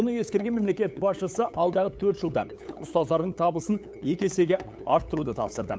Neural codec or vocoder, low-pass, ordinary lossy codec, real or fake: codec, 16 kHz, 8 kbps, FunCodec, trained on LibriTTS, 25 frames a second; none; none; fake